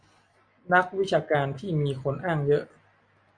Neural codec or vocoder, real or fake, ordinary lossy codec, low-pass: none; real; Opus, 64 kbps; 9.9 kHz